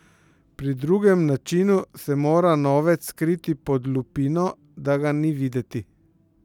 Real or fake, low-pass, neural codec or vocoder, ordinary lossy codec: real; 19.8 kHz; none; none